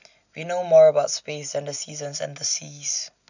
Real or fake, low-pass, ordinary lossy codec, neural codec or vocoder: real; 7.2 kHz; none; none